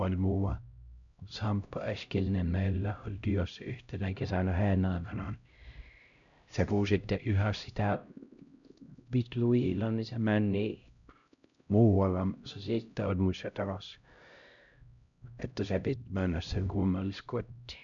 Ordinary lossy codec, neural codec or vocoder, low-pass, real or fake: none; codec, 16 kHz, 0.5 kbps, X-Codec, HuBERT features, trained on LibriSpeech; 7.2 kHz; fake